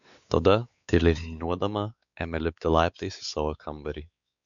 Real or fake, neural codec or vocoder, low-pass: fake; codec, 16 kHz, 4 kbps, X-Codec, WavLM features, trained on Multilingual LibriSpeech; 7.2 kHz